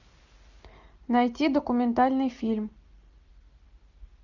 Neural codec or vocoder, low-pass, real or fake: none; 7.2 kHz; real